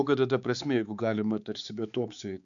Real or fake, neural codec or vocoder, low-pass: fake; codec, 16 kHz, 4 kbps, X-Codec, HuBERT features, trained on balanced general audio; 7.2 kHz